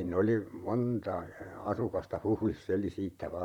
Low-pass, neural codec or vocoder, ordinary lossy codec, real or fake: 19.8 kHz; vocoder, 44.1 kHz, 128 mel bands, Pupu-Vocoder; none; fake